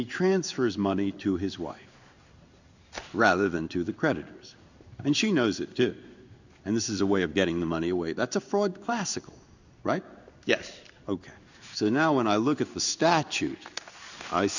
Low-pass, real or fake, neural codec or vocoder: 7.2 kHz; fake; codec, 16 kHz in and 24 kHz out, 1 kbps, XY-Tokenizer